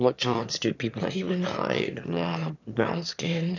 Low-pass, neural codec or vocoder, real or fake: 7.2 kHz; autoencoder, 22.05 kHz, a latent of 192 numbers a frame, VITS, trained on one speaker; fake